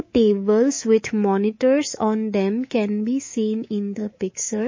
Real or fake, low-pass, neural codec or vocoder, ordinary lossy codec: fake; 7.2 kHz; codec, 24 kHz, 3.1 kbps, DualCodec; MP3, 32 kbps